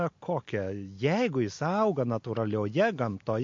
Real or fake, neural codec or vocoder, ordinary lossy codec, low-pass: real; none; MP3, 48 kbps; 7.2 kHz